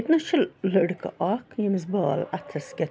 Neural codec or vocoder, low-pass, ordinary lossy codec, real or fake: none; none; none; real